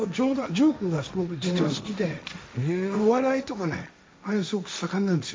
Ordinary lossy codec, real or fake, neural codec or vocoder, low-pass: none; fake; codec, 16 kHz, 1.1 kbps, Voila-Tokenizer; none